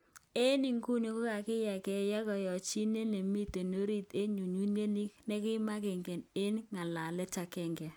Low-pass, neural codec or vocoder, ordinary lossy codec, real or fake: none; none; none; real